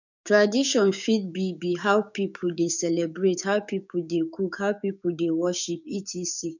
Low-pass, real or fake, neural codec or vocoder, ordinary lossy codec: 7.2 kHz; fake; codec, 16 kHz, 6 kbps, DAC; none